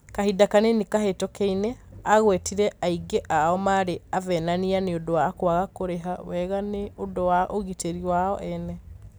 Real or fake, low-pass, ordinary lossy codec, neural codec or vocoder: real; none; none; none